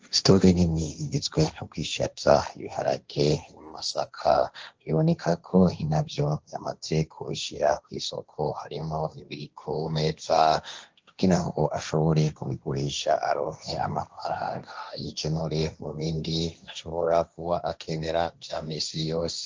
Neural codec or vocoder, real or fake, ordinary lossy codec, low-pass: codec, 16 kHz, 1.1 kbps, Voila-Tokenizer; fake; Opus, 24 kbps; 7.2 kHz